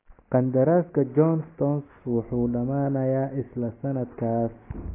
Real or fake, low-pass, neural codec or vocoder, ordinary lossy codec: real; 3.6 kHz; none; AAC, 16 kbps